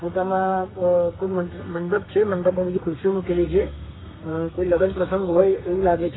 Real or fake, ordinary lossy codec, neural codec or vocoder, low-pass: fake; AAC, 16 kbps; codec, 32 kHz, 1.9 kbps, SNAC; 7.2 kHz